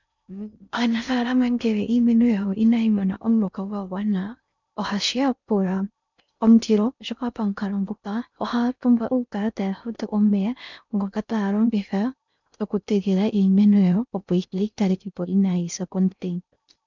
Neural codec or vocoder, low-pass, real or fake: codec, 16 kHz in and 24 kHz out, 0.6 kbps, FocalCodec, streaming, 4096 codes; 7.2 kHz; fake